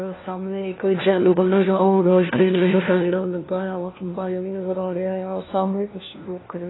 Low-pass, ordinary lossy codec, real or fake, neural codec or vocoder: 7.2 kHz; AAC, 16 kbps; fake; codec, 16 kHz, 1 kbps, FunCodec, trained on LibriTTS, 50 frames a second